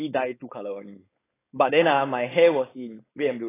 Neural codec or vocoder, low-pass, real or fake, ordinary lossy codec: codec, 16 kHz, 4.8 kbps, FACodec; 3.6 kHz; fake; AAC, 16 kbps